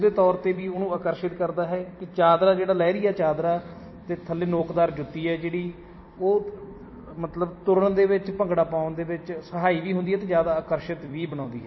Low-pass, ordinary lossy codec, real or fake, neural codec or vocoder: 7.2 kHz; MP3, 24 kbps; fake; vocoder, 44.1 kHz, 128 mel bands every 256 samples, BigVGAN v2